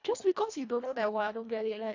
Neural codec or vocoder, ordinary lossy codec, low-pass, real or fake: codec, 24 kHz, 1.5 kbps, HILCodec; AAC, 48 kbps; 7.2 kHz; fake